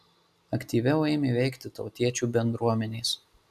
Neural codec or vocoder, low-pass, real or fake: none; 14.4 kHz; real